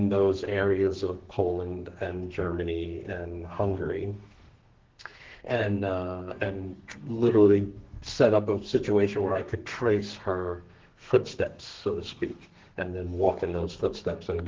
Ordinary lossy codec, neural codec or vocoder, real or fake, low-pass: Opus, 16 kbps; codec, 32 kHz, 1.9 kbps, SNAC; fake; 7.2 kHz